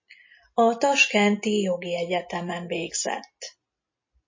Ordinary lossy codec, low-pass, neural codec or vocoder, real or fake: MP3, 32 kbps; 7.2 kHz; none; real